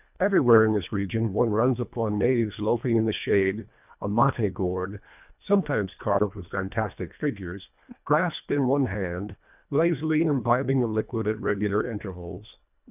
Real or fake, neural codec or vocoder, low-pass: fake; codec, 24 kHz, 1.5 kbps, HILCodec; 3.6 kHz